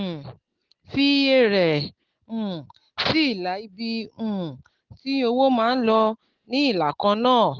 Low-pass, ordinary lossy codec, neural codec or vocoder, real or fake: 7.2 kHz; Opus, 16 kbps; none; real